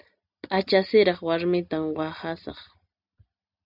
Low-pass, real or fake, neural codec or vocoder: 5.4 kHz; real; none